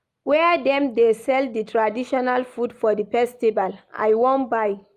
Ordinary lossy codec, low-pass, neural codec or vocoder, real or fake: Opus, 32 kbps; 14.4 kHz; none; real